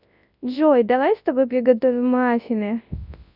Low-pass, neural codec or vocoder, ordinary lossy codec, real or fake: 5.4 kHz; codec, 24 kHz, 0.9 kbps, WavTokenizer, large speech release; none; fake